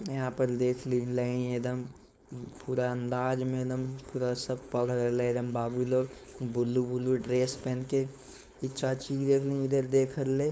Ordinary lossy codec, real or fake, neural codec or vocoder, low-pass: none; fake; codec, 16 kHz, 4.8 kbps, FACodec; none